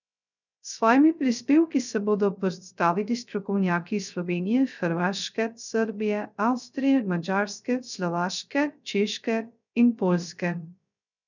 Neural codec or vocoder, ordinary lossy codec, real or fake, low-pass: codec, 16 kHz, 0.3 kbps, FocalCodec; none; fake; 7.2 kHz